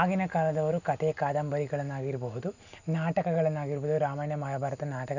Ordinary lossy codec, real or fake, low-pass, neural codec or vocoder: none; real; 7.2 kHz; none